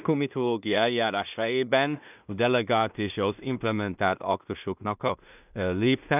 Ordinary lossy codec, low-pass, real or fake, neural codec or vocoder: none; 3.6 kHz; fake; codec, 16 kHz in and 24 kHz out, 0.4 kbps, LongCat-Audio-Codec, two codebook decoder